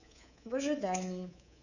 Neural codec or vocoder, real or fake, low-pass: codec, 24 kHz, 3.1 kbps, DualCodec; fake; 7.2 kHz